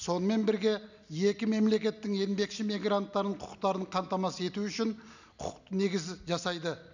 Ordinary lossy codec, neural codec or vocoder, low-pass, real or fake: none; none; 7.2 kHz; real